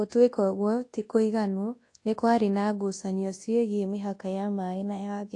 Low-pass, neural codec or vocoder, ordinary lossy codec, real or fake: 10.8 kHz; codec, 24 kHz, 0.9 kbps, WavTokenizer, large speech release; AAC, 48 kbps; fake